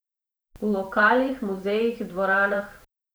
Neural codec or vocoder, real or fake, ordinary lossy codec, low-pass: vocoder, 44.1 kHz, 128 mel bands every 512 samples, BigVGAN v2; fake; none; none